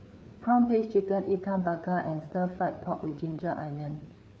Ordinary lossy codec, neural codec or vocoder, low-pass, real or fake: none; codec, 16 kHz, 4 kbps, FreqCodec, larger model; none; fake